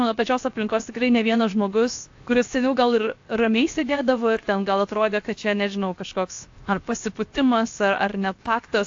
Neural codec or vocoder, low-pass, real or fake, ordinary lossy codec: codec, 16 kHz, 0.7 kbps, FocalCodec; 7.2 kHz; fake; AAC, 48 kbps